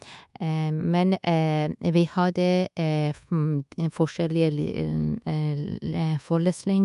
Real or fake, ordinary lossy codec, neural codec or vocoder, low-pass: fake; none; codec, 24 kHz, 1.2 kbps, DualCodec; 10.8 kHz